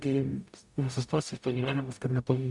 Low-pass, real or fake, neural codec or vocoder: 10.8 kHz; fake; codec, 44.1 kHz, 0.9 kbps, DAC